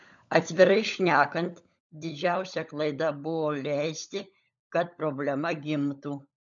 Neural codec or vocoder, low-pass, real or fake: codec, 16 kHz, 16 kbps, FunCodec, trained on LibriTTS, 50 frames a second; 7.2 kHz; fake